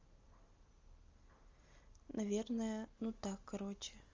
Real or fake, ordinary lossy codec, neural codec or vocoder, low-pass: real; Opus, 32 kbps; none; 7.2 kHz